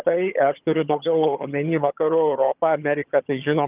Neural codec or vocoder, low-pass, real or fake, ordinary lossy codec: vocoder, 22.05 kHz, 80 mel bands, HiFi-GAN; 3.6 kHz; fake; Opus, 32 kbps